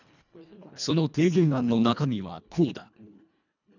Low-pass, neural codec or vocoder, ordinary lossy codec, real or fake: 7.2 kHz; codec, 24 kHz, 1.5 kbps, HILCodec; none; fake